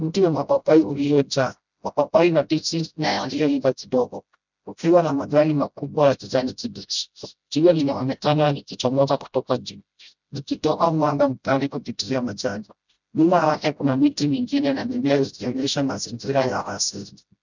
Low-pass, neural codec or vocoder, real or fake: 7.2 kHz; codec, 16 kHz, 0.5 kbps, FreqCodec, smaller model; fake